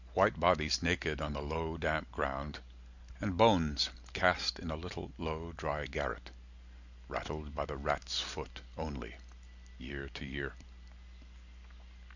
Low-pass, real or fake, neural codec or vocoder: 7.2 kHz; real; none